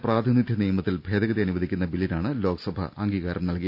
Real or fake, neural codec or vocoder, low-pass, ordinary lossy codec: real; none; 5.4 kHz; none